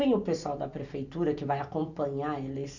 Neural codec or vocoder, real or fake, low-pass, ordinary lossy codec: none; real; 7.2 kHz; none